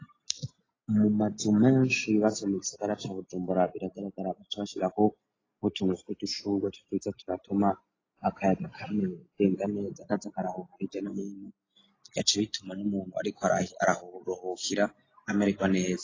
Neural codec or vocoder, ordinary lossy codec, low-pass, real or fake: none; AAC, 32 kbps; 7.2 kHz; real